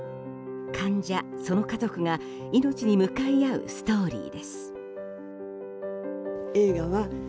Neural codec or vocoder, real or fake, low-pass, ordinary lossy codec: none; real; none; none